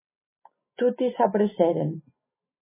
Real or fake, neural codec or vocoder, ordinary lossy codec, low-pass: real; none; MP3, 16 kbps; 3.6 kHz